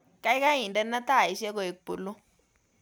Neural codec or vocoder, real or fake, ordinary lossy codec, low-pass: none; real; none; none